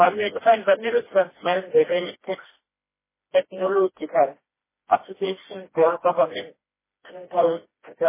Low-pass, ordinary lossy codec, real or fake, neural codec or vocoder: 3.6 kHz; MP3, 16 kbps; fake; codec, 16 kHz, 1 kbps, FreqCodec, smaller model